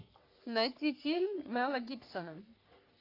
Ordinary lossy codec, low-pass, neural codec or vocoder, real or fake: AAC, 32 kbps; 5.4 kHz; codec, 44.1 kHz, 3.4 kbps, Pupu-Codec; fake